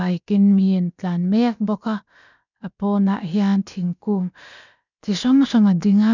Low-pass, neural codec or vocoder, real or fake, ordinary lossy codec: 7.2 kHz; codec, 16 kHz, about 1 kbps, DyCAST, with the encoder's durations; fake; none